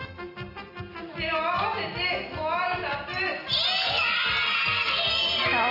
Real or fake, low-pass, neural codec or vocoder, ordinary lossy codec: real; 5.4 kHz; none; none